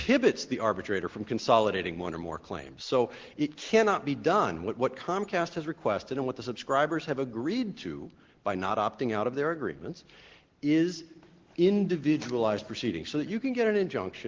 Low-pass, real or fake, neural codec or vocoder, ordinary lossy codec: 7.2 kHz; real; none; Opus, 16 kbps